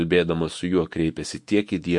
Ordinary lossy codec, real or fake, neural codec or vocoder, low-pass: MP3, 48 kbps; fake; autoencoder, 48 kHz, 128 numbers a frame, DAC-VAE, trained on Japanese speech; 10.8 kHz